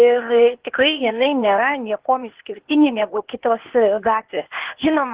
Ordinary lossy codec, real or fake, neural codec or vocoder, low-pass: Opus, 16 kbps; fake; codec, 16 kHz, 0.8 kbps, ZipCodec; 3.6 kHz